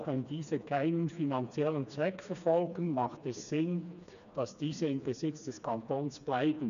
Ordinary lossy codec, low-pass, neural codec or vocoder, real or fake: none; 7.2 kHz; codec, 16 kHz, 2 kbps, FreqCodec, smaller model; fake